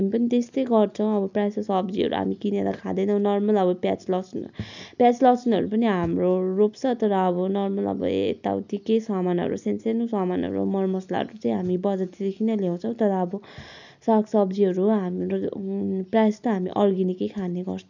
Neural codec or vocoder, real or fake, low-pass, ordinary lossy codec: none; real; 7.2 kHz; none